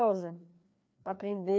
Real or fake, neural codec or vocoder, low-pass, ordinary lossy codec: fake; codec, 16 kHz, 2 kbps, FreqCodec, larger model; none; none